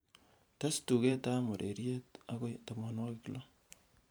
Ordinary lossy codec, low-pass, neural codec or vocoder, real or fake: none; none; vocoder, 44.1 kHz, 128 mel bands every 512 samples, BigVGAN v2; fake